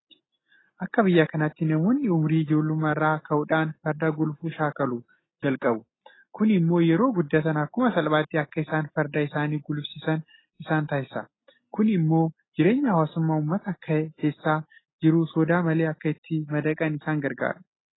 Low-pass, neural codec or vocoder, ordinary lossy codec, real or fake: 7.2 kHz; none; AAC, 16 kbps; real